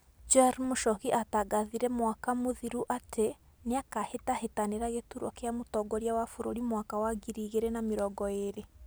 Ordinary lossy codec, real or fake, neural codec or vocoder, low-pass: none; real; none; none